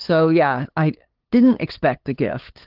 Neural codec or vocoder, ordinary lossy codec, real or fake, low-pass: codec, 16 kHz, 8 kbps, FunCodec, trained on LibriTTS, 25 frames a second; Opus, 16 kbps; fake; 5.4 kHz